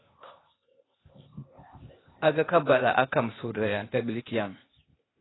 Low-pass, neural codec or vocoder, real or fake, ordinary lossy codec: 7.2 kHz; codec, 16 kHz, 0.8 kbps, ZipCodec; fake; AAC, 16 kbps